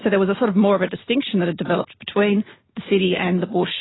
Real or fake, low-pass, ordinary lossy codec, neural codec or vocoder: real; 7.2 kHz; AAC, 16 kbps; none